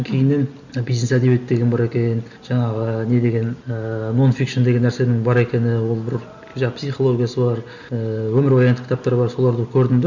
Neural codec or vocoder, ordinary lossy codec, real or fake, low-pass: none; none; real; 7.2 kHz